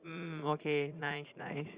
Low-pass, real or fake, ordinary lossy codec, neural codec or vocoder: 3.6 kHz; fake; Opus, 64 kbps; vocoder, 44.1 kHz, 80 mel bands, Vocos